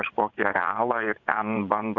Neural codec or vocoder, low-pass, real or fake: vocoder, 22.05 kHz, 80 mel bands, Vocos; 7.2 kHz; fake